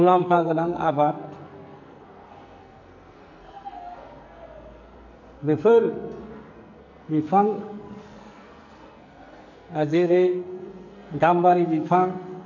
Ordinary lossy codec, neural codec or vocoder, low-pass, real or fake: none; codec, 44.1 kHz, 2.6 kbps, SNAC; 7.2 kHz; fake